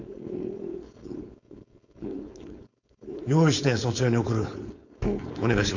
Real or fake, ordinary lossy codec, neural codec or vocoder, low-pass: fake; none; codec, 16 kHz, 4.8 kbps, FACodec; 7.2 kHz